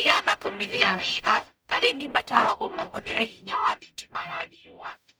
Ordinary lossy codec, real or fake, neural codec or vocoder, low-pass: none; fake; codec, 44.1 kHz, 0.9 kbps, DAC; none